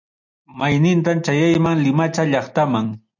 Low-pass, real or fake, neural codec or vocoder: 7.2 kHz; real; none